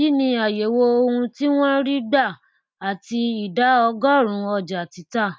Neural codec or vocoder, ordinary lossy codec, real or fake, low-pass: none; none; real; none